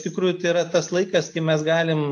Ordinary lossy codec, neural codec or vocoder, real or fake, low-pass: AAC, 64 kbps; none; real; 10.8 kHz